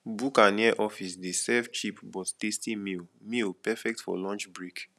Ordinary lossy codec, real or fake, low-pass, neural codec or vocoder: none; real; none; none